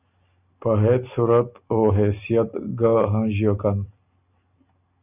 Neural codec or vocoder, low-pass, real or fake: none; 3.6 kHz; real